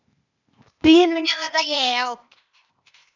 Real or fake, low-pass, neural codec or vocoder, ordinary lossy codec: fake; 7.2 kHz; codec, 16 kHz, 0.8 kbps, ZipCodec; none